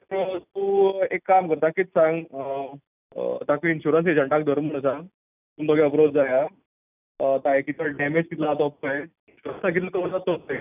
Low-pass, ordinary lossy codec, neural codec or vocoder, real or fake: 3.6 kHz; none; none; real